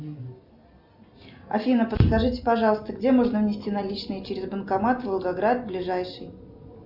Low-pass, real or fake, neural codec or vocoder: 5.4 kHz; real; none